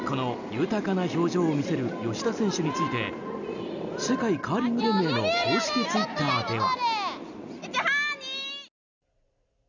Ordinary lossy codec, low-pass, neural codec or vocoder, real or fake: none; 7.2 kHz; none; real